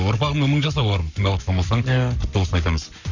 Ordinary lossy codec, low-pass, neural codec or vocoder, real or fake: none; 7.2 kHz; codec, 44.1 kHz, 7.8 kbps, Pupu-Codec; fake